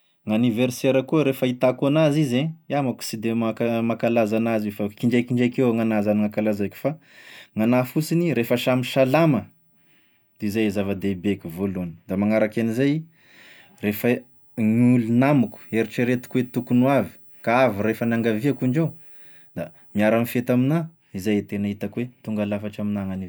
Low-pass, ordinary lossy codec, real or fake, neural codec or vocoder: none; none; real; none